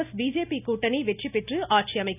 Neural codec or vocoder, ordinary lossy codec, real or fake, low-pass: none; none; real; 3.6 kHz